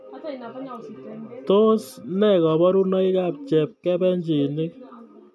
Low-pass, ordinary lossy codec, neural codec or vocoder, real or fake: none; none; none; real